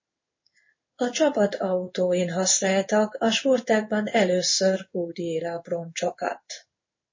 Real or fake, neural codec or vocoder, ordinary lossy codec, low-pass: fake; codec, 16 kHz in and 24 kHz out, 1 kbps, XY-Tokenizer; MP3, 32 kbps; 7.2 kHz